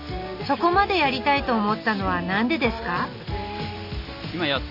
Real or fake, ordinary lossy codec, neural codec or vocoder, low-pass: real; none; none; 5.4 kHz